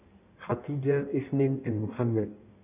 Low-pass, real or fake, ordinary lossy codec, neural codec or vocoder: 3.6 kHz; fake; none; codec, 16 kHz in and 24 kHz out, 1.1 kbps, FireRedTTS-2 codec